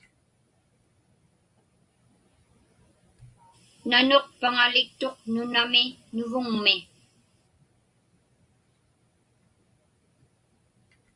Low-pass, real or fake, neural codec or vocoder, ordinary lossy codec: 10.8 kHz; real; none; Opus, 64 kbps